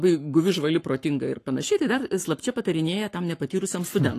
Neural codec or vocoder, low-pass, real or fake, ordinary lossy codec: codec, 44.1 kHz, 7.8 kbps, Pupu-Codec; 14.4 kHz; fake; AAC, 48 kbps